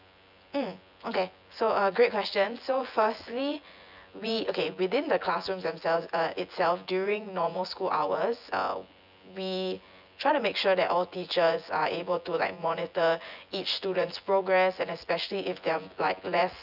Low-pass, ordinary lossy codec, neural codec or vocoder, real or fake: 5.4 kHz; none; vocoder, 24 kHz, 100 mel bands, Vocos; fake